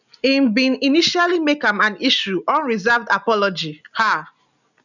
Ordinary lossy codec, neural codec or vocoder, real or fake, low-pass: none; none; real; 7.2 kHz